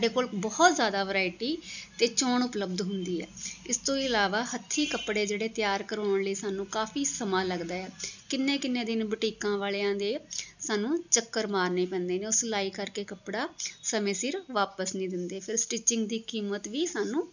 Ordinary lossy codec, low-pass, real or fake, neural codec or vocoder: none; 7.2 kHz; real; none